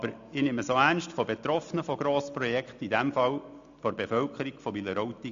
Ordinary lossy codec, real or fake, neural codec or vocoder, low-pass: AAC, 64 kbps; real; none; 7.2 kHz